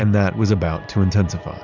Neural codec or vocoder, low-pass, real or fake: vocoder, 44.1 kHz, 128 mel bands every 256 samples, BigVGAN v2; 7.2 kHz; fake